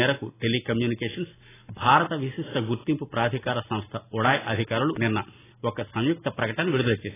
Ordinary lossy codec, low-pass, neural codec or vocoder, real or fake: AAC, 16 kbps; 3.6 kHz; none; real